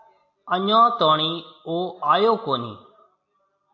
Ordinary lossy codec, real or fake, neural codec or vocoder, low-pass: AAC, 48 kbps; real; none; 7.2 kHz